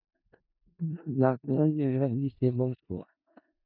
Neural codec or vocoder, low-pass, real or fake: codec, 16 kHz in and 24 kHz out, 0.4 kbps, LongCat-Audio-Codec, four codebook decoder; 5.4 kHz; fake